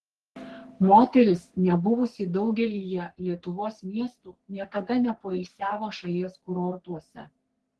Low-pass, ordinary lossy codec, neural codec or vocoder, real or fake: 10.8 kHz; Opus, 16 kbps; codec, 44.1 kHz, 3.4 kbps, Pupu-Codec; fake